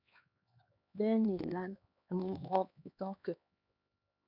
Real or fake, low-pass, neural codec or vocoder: fake; 5.4 kHz; codec, 16 kHz, 2 kbps, X-Codec, HuBERT features, trained on LibriSpeech